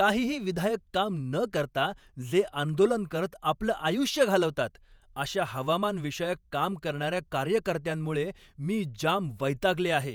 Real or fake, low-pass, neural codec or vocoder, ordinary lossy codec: fake; none; vocoder, 48 kHz, 128 mel bands, Vocos; none